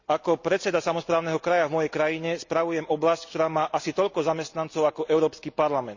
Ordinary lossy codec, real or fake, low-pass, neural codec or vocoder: Opus, 64 kbps; real; 7.2 kHz; none